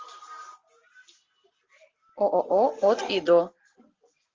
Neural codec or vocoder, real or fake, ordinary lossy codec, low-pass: none; real; Opus, 32 kbps; 7.2 kHz